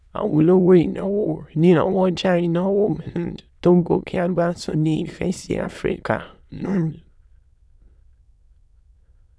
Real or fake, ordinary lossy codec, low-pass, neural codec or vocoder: fake; none; none; autoencoder, 22.05 kHz, a latent of 192 numbers a frame, VITS, trained on many speakers